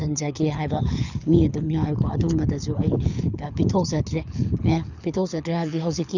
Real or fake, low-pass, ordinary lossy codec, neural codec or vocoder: fake; 7.2 kHz; none; codec, 24 kHz, 6 kbps, HILCodec